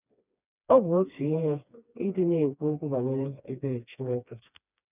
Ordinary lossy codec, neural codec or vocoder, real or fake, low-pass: none; codec, 16 kHz, 2 kbps, FreqCodec, smaller model; fake; 3.6 kHz